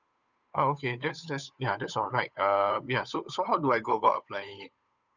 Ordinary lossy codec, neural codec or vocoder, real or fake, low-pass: none; codec, 16 kHz, 8 kbps, FunCodec, trained on Chinese and English, 25 frames a second; fake; 7.2 kHz